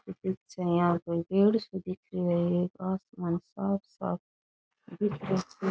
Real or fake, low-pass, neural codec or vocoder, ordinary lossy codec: real; none; none; none